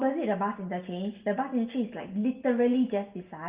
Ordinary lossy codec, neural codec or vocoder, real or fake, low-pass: Opus, 32 kbps; none; real; 3.6 kHz